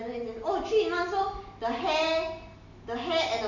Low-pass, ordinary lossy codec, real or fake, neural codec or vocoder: 7.2 kHz; none; real; none